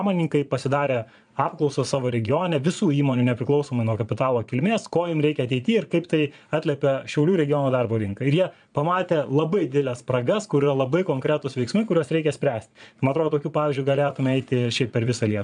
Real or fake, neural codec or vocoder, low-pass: fake; vocoder, 22.05 kHz, 80 mel bands, Vocos; 9.9 kHz